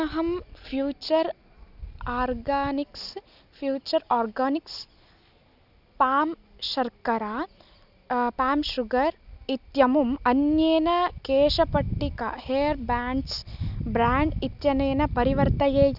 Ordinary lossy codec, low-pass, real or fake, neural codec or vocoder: none; 5.4 kHz; real; none